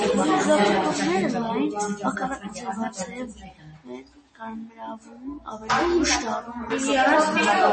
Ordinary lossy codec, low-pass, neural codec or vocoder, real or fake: MP3, 32 kbps; 10.8 kHz; none; real